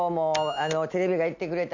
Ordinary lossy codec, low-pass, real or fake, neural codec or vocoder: none; 7.2 kHz; real; none